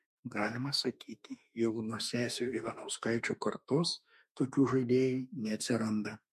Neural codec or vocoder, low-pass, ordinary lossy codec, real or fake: autoencoder, 48 kHz, 32 numbers a frame, DAC-VAE, trained on Japanese speech; 14.4 kHz; MP3, 64 kbps; fake